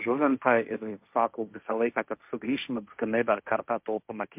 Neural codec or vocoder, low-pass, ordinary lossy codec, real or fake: codec, 16 kHz, 1.1 kbps, Voila-Tokenizer; 3.6 kHz; MP3, 32 kbps; fake